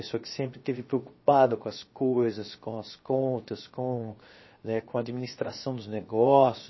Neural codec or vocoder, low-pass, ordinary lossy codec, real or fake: codec, 16 kHz, 0.7 kbps, FocalCodec; 7.2 kHz; MP3, 24 kbps; fake